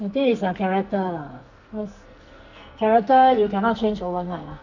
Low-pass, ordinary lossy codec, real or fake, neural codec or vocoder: 7.2 kHz; none; fake; codec, 44.1 kHz, 2.6 kbps, SNAC